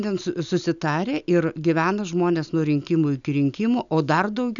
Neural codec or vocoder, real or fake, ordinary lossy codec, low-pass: none; real; MP3, 96 kbps; 7.2 kHz